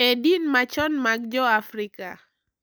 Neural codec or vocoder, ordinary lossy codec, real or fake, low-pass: none; none; real; none